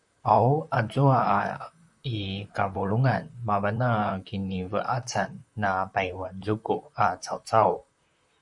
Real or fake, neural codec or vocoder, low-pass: fake; vocoder, 44.1 kHz, 128 mel bands, Pupu-Vocoder; 10.8 kHz